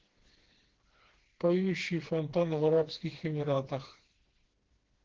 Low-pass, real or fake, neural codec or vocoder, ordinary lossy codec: 7.2 kHz; fake; codec, 16 kHz, 2 kbps, FreqCodec, smaller model; Opus, 16 kbps